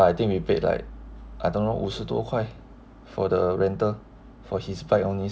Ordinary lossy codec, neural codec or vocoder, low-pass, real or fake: none; none; none; real